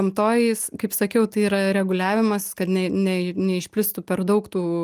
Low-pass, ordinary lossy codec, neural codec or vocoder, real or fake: 14.4 kHz; Opus, 32 kbps; none; real